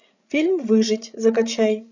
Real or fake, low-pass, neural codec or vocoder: fake; 7.2 kHz; codec, 16 kHz, 16 kbps, FreqCodec, larger model